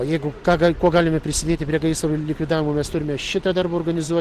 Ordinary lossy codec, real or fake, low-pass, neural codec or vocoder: Opus, 16 kbps; real; 14.4 kHz; none